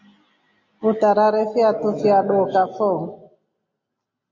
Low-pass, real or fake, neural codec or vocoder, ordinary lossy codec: 7.2 kHz; real; none; MP3, 48 kbps